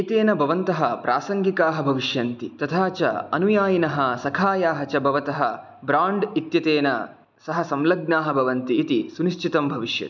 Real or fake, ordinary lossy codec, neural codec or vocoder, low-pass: real; none; none; 7.2 kHz